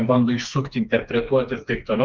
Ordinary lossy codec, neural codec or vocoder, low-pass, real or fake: Opus, 24 kbps; codec, 16 kHz, 2 kbps, FreqCodec, smaller model; 7.2 kHz; fake